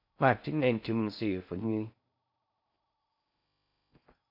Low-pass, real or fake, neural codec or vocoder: 5.4 kHz; fake; codec, 16 kHz in and 24 kHz out, 0.6 kbps, FocalCodec, streaming, 4096 codes